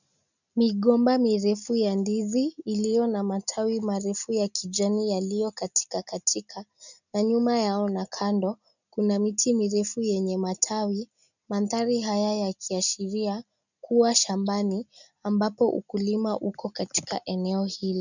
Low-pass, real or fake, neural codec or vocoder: 7.2 kHz; real; none